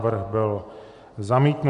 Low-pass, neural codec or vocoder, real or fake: 10.8 kHz; none; real